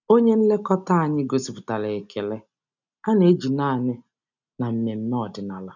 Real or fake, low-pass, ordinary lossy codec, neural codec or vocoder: real; 7.2 kHz; none; none